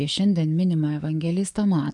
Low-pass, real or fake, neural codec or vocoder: 10.8 kHz; fake; codec, 44.1 kHz, 7.8 kbps, DAC